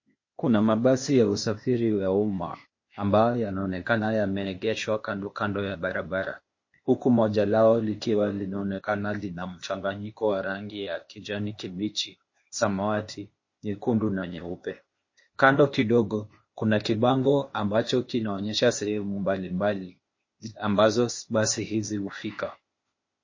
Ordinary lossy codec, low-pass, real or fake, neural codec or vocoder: MP3, 32 kbps; 7.2 kHz; fake; codec, 16 kHz, 0.8 kbps, ZipCodec